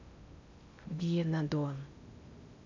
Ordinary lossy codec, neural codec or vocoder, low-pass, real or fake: MP3, 64 kbps; codec, 16 kHz in and 24 kHz out, 0.8 kbps, FocalCodec, streaming, 65536 codes; 7.2 kHz; fake